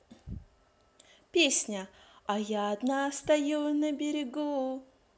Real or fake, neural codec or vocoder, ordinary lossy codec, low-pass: real; none; none; none